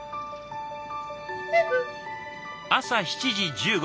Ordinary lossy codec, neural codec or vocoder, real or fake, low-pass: none; none; real; none